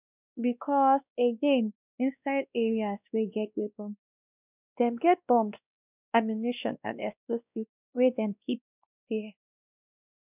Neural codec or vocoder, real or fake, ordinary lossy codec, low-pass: codec, 16 kHz, 1 kbps, X-Codec, WavLM features, trained on Multilingual LibriSpeech; fake; none; 3.6 kHz